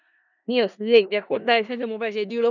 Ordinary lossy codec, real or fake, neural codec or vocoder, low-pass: none; fake; codec, 16 kHz in and 24 kHz out, 0.4 kbps, LongCat-Audio-Codec, four codebook decoder; 7.2 kHz